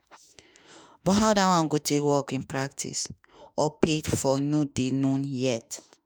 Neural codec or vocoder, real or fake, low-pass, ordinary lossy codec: autoencoder, 48 kHz, 32 numbers a frame, DAC-VAE, trained on Japanese speech; fake; none; none